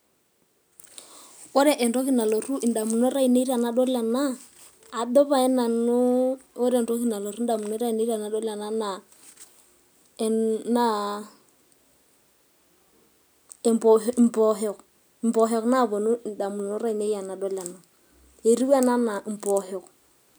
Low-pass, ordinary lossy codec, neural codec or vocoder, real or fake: none; none; none; real